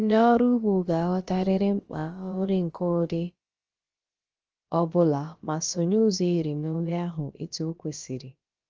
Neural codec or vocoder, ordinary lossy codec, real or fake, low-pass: codec, 16 kHz, about 1 kbps, DyCAST, with the encoder's durations; Opus, 24 kbps; fake; 7.2 kHz